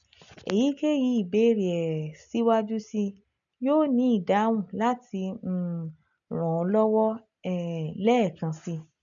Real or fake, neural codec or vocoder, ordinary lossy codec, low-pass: real; none; none; 7.2 kHz